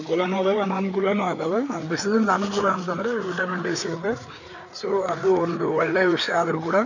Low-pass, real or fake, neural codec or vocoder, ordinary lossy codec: 7.2 kHz; fake; codec, 16 kHz, 4 kbps, FreqCodec, larger model; none